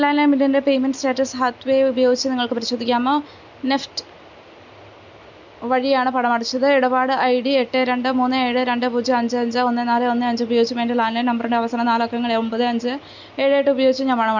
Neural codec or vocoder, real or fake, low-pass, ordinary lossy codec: none; real; 7.2 kHz; none